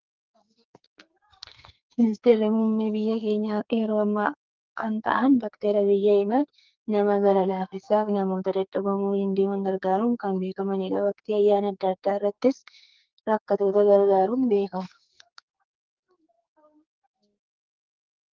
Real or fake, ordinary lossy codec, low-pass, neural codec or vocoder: fake; Opus, 32 kbps; 7.2 kHz; codec, 44.1 kHz, 2.6 kbps, SNAC